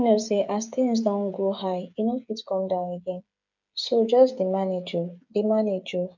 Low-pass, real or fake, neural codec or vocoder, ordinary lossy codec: 7.2 kHz; fake; codec, 16 kHz, 8 kbps, FreqCodec, smaller model; none